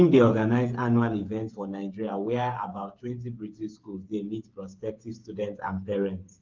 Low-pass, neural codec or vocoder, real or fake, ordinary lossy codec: 7.2 kHz; codec, 16 kHz, 8 kbps, FreqCodec, smaller model; fake; Opus, 32 kbps